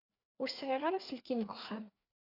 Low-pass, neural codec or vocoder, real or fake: 5.4 kHz; codec, 16 kHz, 4 kbps, FreqCodec, larger model; fake